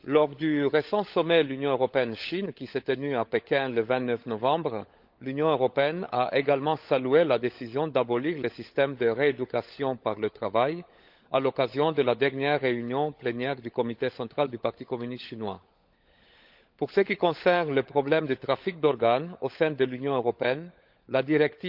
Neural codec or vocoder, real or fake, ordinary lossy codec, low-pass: codec, 16 kHz, 16 kbps, FunCodec, trained on LibriTTS, 50 frames a second; fake; Opus, 32 kbps; 5.4 kHz